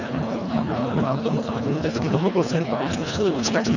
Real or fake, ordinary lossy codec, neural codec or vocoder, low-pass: fake; none; codec, 24 kHz, 1.5 kbps, HILCodec; 7.2 kHz